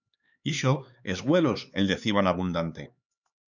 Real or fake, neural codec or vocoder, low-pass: fake; codec, 16 kHz, 4 kbps, X-Codec, HuBERT features, trained on LibriSpeech; 7.2 kHz